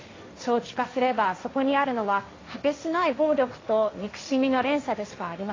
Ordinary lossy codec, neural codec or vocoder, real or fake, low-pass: AAC, 32 kbps; codec, 16 kHz, 1.1 kbps, Voila-Tokenizer; fake; 7.2 kHz